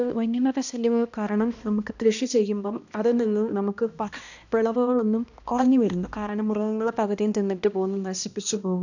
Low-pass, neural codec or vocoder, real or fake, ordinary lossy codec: 7.2 kHz; codec, 16 kHz, 1 kbps, X-Codec, HuBERT features, trained on balanced general audio; fake; none